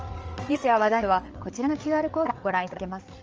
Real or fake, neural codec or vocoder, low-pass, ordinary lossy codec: fake; codec, 16 kHz, 8 kbps, FreqCodec, larger model; 7.2 kHz; Opus, 24 kbps